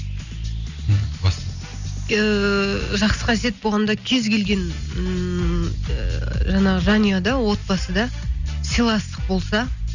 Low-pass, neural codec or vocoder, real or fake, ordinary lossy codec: 7.2 kHz; none; real; none